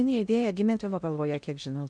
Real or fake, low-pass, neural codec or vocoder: fake; 9.9 kHz; codec, 16 kHz in and 24 kHz out, 0.6 kbps, FocalCodec, streaming, 2048 codes